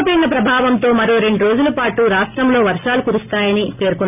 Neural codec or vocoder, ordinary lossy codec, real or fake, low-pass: none; none; real; 3.6 kHz